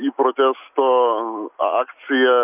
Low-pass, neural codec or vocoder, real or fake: 3.6 kHz; none; real